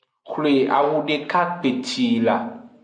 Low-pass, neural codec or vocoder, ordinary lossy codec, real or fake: 9.9 kHz; none; MP3, 64 kbps; real